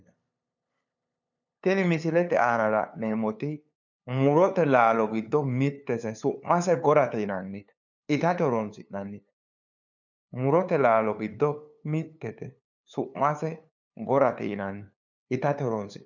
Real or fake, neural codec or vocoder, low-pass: fake; codec, 16 kHz, 2 kbps, FunCodec, trained on LibriTTS, 25 frames a second; 7.2 kHz